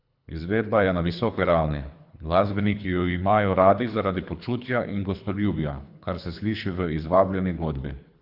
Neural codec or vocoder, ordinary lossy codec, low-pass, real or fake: codec, 24 kHz, 3 kbps, HILCodec; Opus, 64 kbps; 5.4 kHz; fake